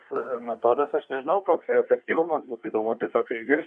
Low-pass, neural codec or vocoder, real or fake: 9.9 kHz; codec, 24 kHz, 1 kbps, SNAC; fake